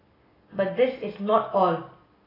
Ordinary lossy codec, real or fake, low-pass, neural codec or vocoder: AAC, 24 kbps; real; 5.4 kHz; none